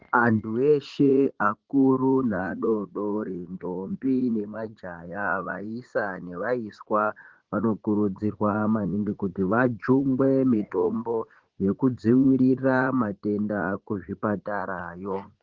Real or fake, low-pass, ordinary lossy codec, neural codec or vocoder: fake; 7.2 kHz; Opus, 16 kbps; vocoder, 44.1 kHz, 80 mel bands, Vocos